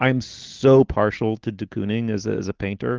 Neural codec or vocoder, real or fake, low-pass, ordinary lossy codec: none; real; 7.2 kHz; Opus, 16 kbps